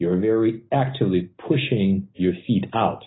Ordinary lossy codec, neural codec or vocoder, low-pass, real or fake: AAC, 16 kbps; none; 7.2 kHz; real